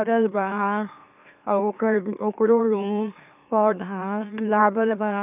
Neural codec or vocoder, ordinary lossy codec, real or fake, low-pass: autoencoder, 44.1 kHz, a latent of 192 numbers a frame, MeloTTS; none; fake; 3.6 kHz